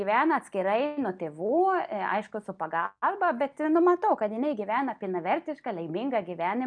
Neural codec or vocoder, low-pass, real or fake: none; 9.9 kHz; real